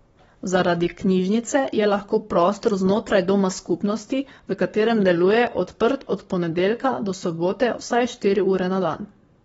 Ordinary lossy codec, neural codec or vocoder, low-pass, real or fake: AAC, 24 kbps; codec, 44.1 kHz, 7.8 kbps, Pupu-Codec; 19.8 kHz; fake